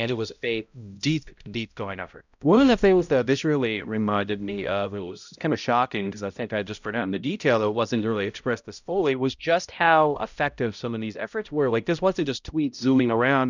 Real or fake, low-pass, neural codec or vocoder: fake; 7.2 kHz; codec, 16 kHz, 0.5 kbps, X-Codec, HuBERT features, trained on balanced general audio